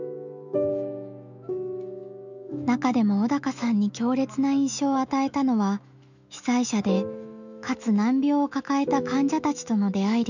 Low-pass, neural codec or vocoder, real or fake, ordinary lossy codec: 7.2 kHz; autoencoder, 48 kHz, 128 numbers a frame, DAC-VAE, trained on Japanese speech; fake; none